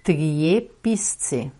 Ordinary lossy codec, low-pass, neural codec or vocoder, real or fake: MP3, 48 kbps; 19.8 kHz; vocoder, 48 kHz, 128 mel bands, Vocos; fake